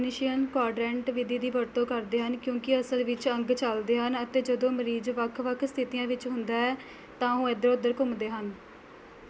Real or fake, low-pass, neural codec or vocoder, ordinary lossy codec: real; none; none; none